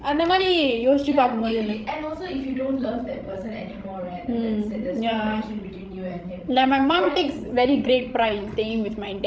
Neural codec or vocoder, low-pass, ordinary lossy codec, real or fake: codec, 16 kHz, 16 kbps, FreqCodec, larger model; none; none; fake